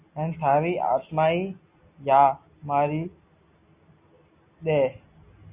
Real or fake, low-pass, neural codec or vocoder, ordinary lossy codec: real; 3.6 kHz; none; Opus, 64 kbps